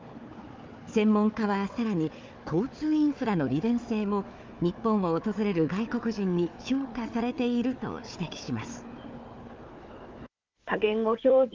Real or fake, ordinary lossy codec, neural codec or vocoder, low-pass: fake; Opus, 24 kbps; codec, 16 kHz, 4 kbps, FunCodec, trained on Chinese and English, 50 frames a second; 7.2 kHz